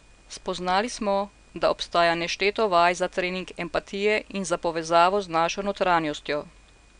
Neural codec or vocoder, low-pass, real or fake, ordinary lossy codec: none; 9.9 kHz; real; none